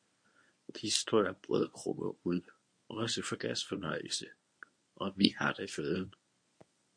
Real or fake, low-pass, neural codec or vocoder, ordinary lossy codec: fake; 9.9 kHz; codec, 24 kHz, 0.9 kbps, WavTokenizer, medium speech release version 1; MP3, 48 kbps